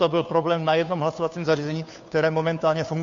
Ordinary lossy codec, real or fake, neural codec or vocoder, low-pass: MP3, 48 kbps; fake; codec, 16 kHz, 2 kbps, FunCodec, trained on Chinese and English, 25 frames a second; 7.2 kHz